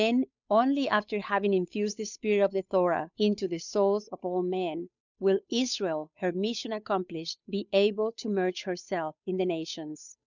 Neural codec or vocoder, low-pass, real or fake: codec, 16 kHz, 8 kbps, FunCodec, trained on Chinese and English, 25 frames a second; 7.2 kHz; fake